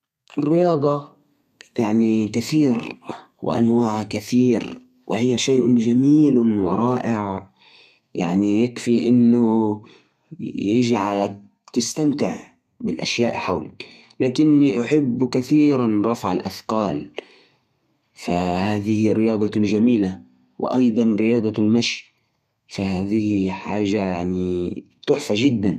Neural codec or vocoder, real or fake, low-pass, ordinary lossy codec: codec, 32 kHz, 1.9 kbps, SNAC; fake; 14.4 kHz; none